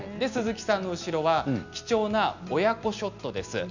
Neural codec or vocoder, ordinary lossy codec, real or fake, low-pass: none; none; real; 7.2 kHz